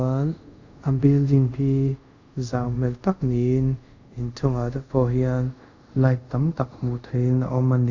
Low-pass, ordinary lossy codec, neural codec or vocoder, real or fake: 7.2 kHz; Opus, 64 kbps; codec, 24 kHz, 0.5 kbps, DualCodec; fake